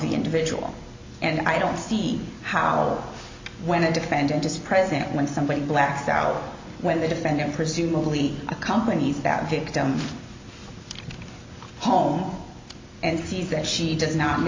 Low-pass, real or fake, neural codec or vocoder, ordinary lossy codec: 7.2 kHz; real; none; MP3, 64 kbps